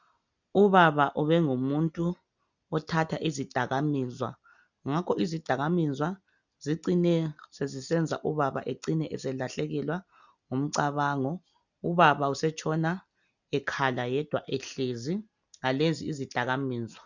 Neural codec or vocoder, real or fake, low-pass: none; real; 7.2 kHz